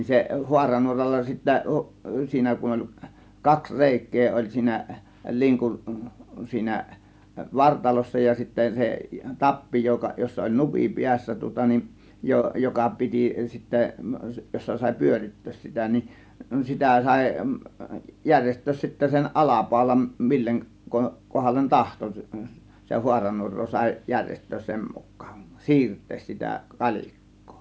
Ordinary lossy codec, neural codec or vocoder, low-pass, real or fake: none; none; none; real